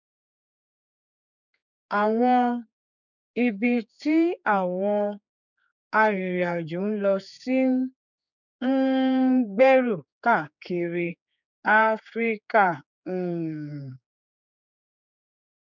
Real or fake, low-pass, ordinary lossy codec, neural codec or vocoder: fake; 7.2 kHz; none; codec, 16 kHz, 4 kbps, X-Codec, HuBERT features, trained on general audio